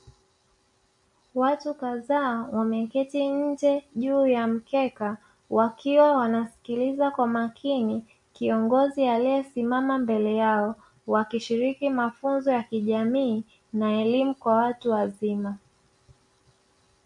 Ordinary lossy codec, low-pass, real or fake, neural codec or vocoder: MP3, 48 kbps; 10.8 kHz; real; none